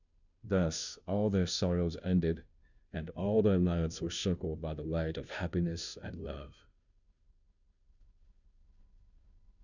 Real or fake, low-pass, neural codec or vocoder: fake; 7.2 kHz; codec, 16 kHz, 0.5 kbps, FunCodec, trained on Chinese and English, 25 frames a second